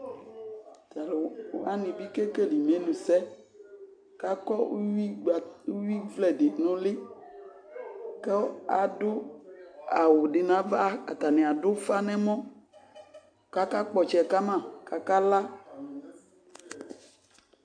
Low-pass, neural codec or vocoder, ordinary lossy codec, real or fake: 9.9 kHz; none; AAC, 64 kbps; real